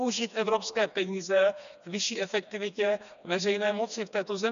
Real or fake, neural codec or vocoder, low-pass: fake; codec, 16 kHz, 2 kbps, FreqCodec, smaller model; 7.2 kHz